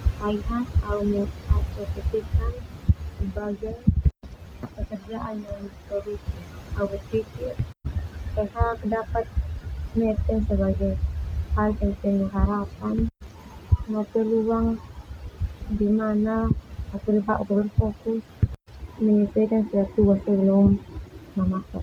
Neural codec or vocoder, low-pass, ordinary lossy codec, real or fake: none; 19.8 kHz; none; real